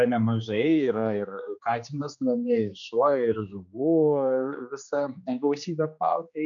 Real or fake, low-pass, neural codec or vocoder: fake; 7.2 kHz; codec, 16 kHz, 2 kbps, X-Codec, HuBERT features, trained on general audio